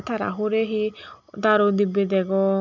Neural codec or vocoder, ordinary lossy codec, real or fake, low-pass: none; none; real; 7.2 kHz